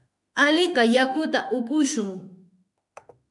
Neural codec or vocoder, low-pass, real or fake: autoencoder, 48 kHz, 32 numbers a frame, DAC-VAE, trained on Japanese speech; 10.8 kHz; fake